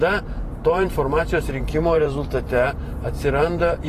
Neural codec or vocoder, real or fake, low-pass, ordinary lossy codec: vocoder, 44.1 kHz, 128 mel bands every 512 samples, BigVGAN v2; fake; 14.4 kHz; AAC, 48 kbps